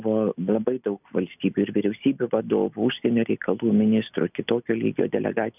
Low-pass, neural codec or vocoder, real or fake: 3.6 kHz; none; real